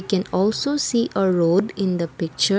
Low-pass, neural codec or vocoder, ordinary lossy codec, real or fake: none; none; none; real